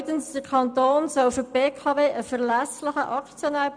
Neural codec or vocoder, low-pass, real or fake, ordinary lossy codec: none; 9.9 kHz; real; none